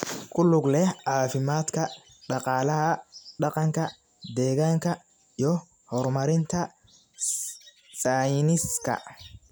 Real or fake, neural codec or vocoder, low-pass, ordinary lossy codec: real; none; none; none